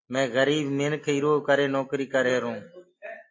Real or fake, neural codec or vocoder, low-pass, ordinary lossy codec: real; none; 7.2 kHz; MP3, 32 kbps